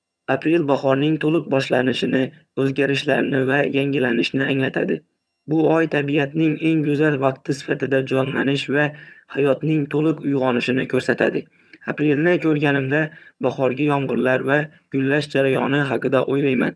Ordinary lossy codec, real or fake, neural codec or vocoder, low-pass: none; fake; vocoder, 22.05 kHz, 80 mel bands, HiFi-GAN; none